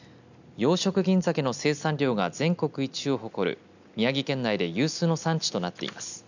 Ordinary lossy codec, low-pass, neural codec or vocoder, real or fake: none; 7.2 kHz; none; real